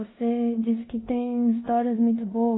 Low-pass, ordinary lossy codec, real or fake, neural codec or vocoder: 7.2 kHz; AAC, 16 kbps; fake; codec, 16 kHz in and 24 kHz out, 0.9 kbps, LongCat-Audio-Codec, four codebook decoder